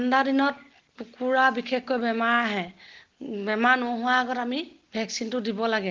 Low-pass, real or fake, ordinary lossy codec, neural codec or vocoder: 7.2 kHz; real; Opus, 16 kbps; none